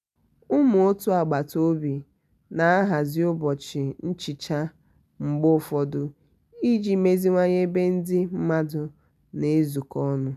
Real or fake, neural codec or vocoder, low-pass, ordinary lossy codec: real; none; 14.4 kHz; none